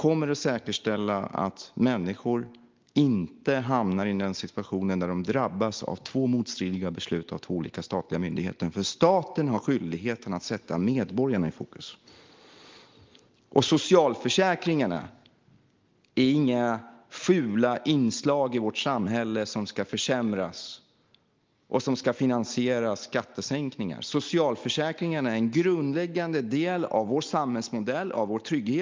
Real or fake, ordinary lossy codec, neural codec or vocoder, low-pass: real; Opus, 32 kbps; none; 7.2 kHz